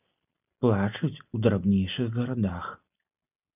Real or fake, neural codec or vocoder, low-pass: real; none; 3.6 kHz